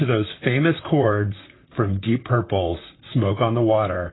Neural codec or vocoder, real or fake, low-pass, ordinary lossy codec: vocoder, 44.1 kHz, 128 mel bands, Pupu-Vocoder; fake; 7.2 kHz; AAC, 16 kbps